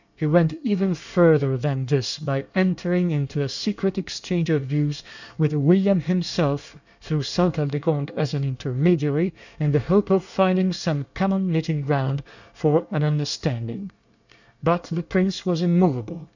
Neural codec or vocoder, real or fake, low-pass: codec, 24 kHz, 1 kbps, SNAC; fake; 7.2 kHz